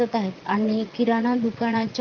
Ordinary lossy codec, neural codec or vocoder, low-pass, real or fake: Opus, 24 kbps; vocoder, 22.05 kHz, 80 mel bands, Vocos; 7.2 kHz; fake